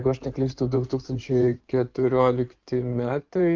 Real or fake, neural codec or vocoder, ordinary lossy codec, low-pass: fake; codec, 16 kHz in and 24 kHz out, 2.2 kbps, FireRedTTS-2 codec; Opus, 24 kbps; 7.2 kHz